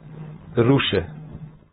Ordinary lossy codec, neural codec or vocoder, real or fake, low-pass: AAC, 16 kbps; codec, 16 kHz, 4.8 kbps, FACodec; fake; 7.2 kHz